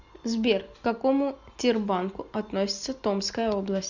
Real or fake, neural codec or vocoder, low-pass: real; none; 7.2 kHz